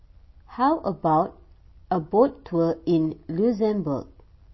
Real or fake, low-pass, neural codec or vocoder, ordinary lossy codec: real; 7.2 kHz; none; MP3, 24 kbps